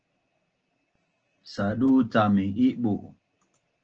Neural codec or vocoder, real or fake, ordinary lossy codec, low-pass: none; real; Opus, 16 kbps; 7.2 kHz